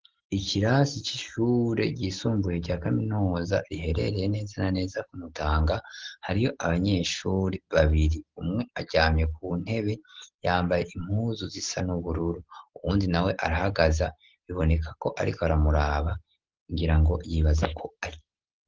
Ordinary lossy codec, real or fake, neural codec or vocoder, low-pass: Opus, 16 kbps; real; none; 7.2 kHz